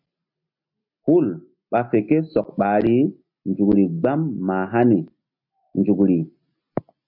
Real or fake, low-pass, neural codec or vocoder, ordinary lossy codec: real; 5.4 kHz; none; MP3, 48 kbps